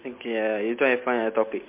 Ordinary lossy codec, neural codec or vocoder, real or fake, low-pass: MP3, 24 kbps; none; real; 3.6 kHz